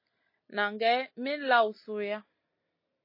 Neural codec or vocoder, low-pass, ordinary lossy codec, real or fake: none; 5.4 kHz; MP3, 24 kbps; real